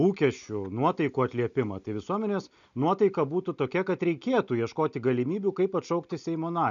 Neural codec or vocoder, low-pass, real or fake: none; 7.2 kHz; real